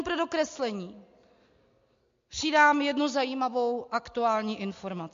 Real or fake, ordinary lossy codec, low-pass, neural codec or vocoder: real; MP3, 48 kbps; 7.2 kHz; none